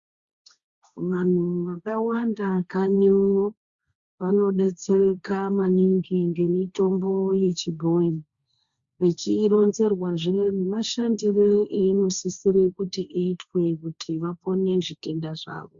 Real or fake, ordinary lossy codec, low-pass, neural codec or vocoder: fake; Opus, 64 kbps; 7.2 kHz; codec, 16 kHz, 1.1 kbps, Voila-Tokenizer